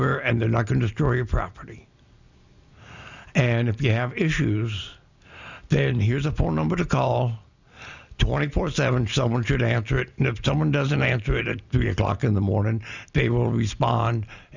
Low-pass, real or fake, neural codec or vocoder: 7.2 kHz; real; none